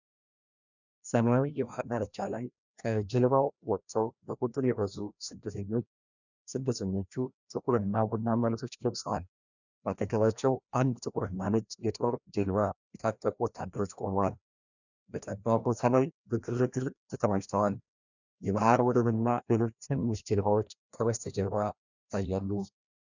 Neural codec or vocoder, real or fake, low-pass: codec, 16 kHz, 1 kbps, FreqCodec, larger model; fake; 7.2 kHz